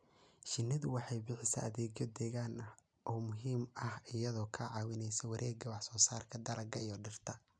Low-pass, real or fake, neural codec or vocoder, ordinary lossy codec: 9.9 kHz; real; none; none